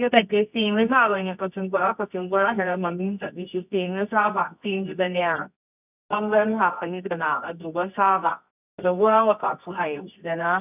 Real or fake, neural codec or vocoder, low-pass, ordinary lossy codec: fake; codec, 24 kHz, 0.9 kbps, WavTokenizer, medium music audio release; 3.6 kHz; none